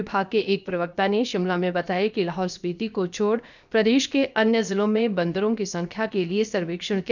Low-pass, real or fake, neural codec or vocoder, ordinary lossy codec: 7.2 kHz; fake; codec, 16 kHz, about 1 kbps, DyCAST, with the encoder's durations; none